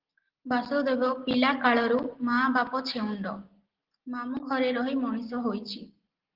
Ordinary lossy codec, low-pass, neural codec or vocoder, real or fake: Opus, 16 kbps; 5.4 kHz; none; real